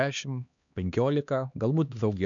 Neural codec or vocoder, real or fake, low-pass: codec, 16 kHz, 2 kbps, X-Codec, HuBERT features, trained on LibriSpeech; fake; 7.2 kHz